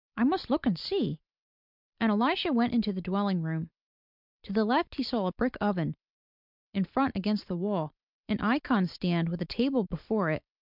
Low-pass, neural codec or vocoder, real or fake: 5.4 kHz; none; real